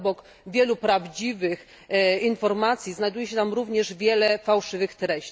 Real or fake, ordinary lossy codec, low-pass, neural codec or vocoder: real; none; none; none